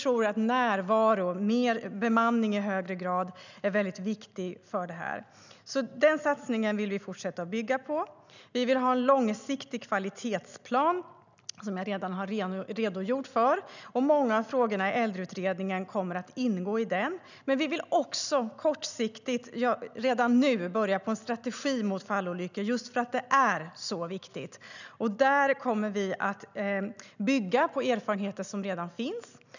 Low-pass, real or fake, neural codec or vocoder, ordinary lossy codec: 7.2 kHz; real; none; none